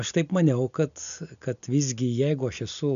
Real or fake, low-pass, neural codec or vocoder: real; 7.2 kHz; none